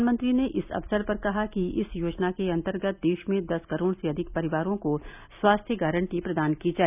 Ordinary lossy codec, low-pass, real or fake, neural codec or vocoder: none; 3.6 kHz; real; none